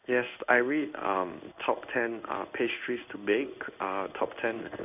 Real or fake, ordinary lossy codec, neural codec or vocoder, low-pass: fake; none; codec, 16 kHz in and 24 kHz out, 1 kbps, XY-Tokenizer; 3.6 kHz